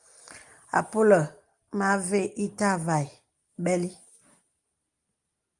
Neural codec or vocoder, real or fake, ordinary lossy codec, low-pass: none; real; Opus, 32 kbps; 10.8 kHz